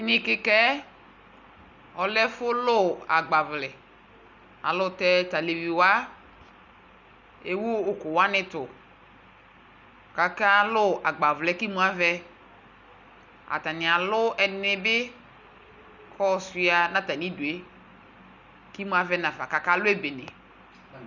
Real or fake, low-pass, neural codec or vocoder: real; 7.2 kHz; none